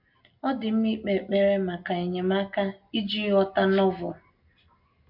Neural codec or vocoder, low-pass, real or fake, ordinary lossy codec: none; 5.4 kHz; real; MP3, 48 kbps